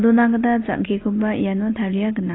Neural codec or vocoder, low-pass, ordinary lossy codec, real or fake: none; 7.2 kHz; AAC, 16 kbps; real